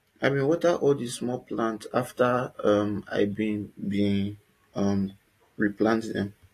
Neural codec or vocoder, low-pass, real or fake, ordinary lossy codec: none; 14.4 kHz; real; AAC, 48 kbps